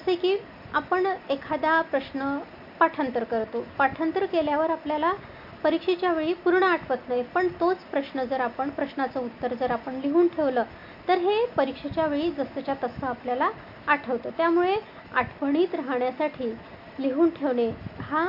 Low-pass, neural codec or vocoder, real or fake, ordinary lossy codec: 5.4 kHz; none; real; none